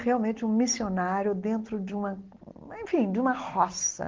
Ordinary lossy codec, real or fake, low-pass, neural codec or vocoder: Opus, 16 kbps; real; 7.2 kHz; none